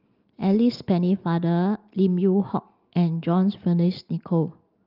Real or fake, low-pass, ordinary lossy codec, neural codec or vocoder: real; 5.4 kHz; Opus, 32 kbps; none